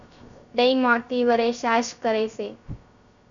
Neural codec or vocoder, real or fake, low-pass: codec, 16 kHz, 0.3 kbps, FocalCodec; fake; 7.2 kHz